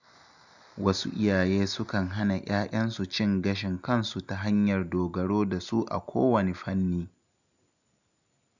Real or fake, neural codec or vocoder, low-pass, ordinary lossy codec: real; none; 7.2 kHz; none